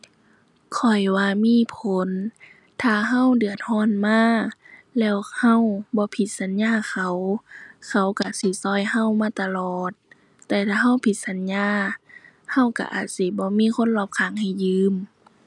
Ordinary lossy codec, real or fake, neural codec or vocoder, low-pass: none; real; none; 10.8 kHz